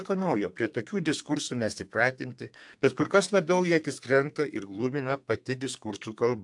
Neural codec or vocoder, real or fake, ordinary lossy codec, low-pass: codec, 32 kHz, 1.9 kbps, SNAC; fake; AAC, 64 kbps; 10.8 kHz